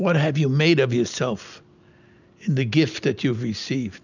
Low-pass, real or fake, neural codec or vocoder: 7.2 kHz; real; none